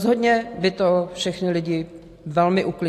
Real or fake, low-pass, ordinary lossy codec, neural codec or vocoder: real; 14.4 kHz; AAC, 64 kbps; none